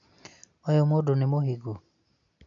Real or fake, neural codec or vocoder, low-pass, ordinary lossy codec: real; none; 7.2 kHz; MP3, 64 kbps